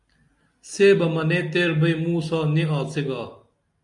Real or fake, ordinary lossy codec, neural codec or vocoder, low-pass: real; MP3, 96 kbps; none; 10.8 kHz